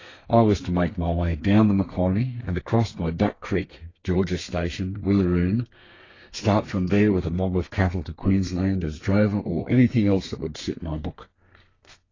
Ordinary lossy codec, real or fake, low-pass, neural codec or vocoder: AAC, 32 kbps; fake; 7.2 kHz; codec, 32 kHz, 1.9 kbps, SNAC